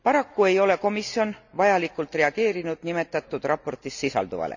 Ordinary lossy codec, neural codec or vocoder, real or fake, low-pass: none; none; real; 7.2 kHz